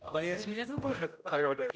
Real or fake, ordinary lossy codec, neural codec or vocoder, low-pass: fake; none; codec, 16 kHz, 0.5 kbps, X-Codec, HuBERT features, trained on general audio; none